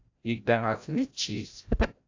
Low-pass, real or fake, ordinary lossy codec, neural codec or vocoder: 7.2 kHz; fake; AAC, 48 kbps; codec, 16 kHz, 0.5 kbps, FreqCodec, larger model